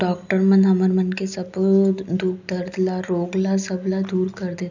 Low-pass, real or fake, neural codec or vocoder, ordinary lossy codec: 7.2 kHz; real; none; none